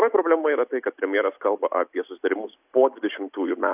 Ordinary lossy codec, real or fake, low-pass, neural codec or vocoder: AAC, 32 kbps; real; 3.6 kHz; none